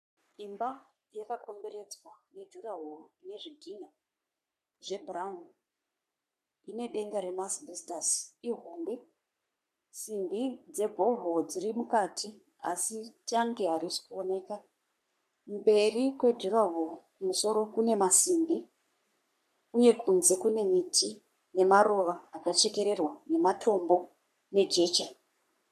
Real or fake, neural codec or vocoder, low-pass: fake; codec, 44.1 kHz, 3.4 kbps, Pupu-Codec; 14.4 kHz